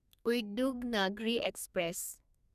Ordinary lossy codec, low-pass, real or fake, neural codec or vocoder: none; 14.4 kHz; fake; codec, 32 kHz, 1.9 kbps, SNAC